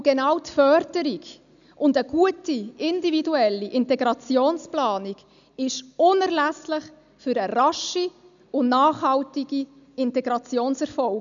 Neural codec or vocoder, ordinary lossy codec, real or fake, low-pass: none; none; real; 7.2 kHz